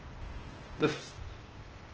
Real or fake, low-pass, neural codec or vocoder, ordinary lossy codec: fake; 7.2 kHz; codec, 16 kHz, 0.8 kbps, ZipCodec; Opus, 16 kbps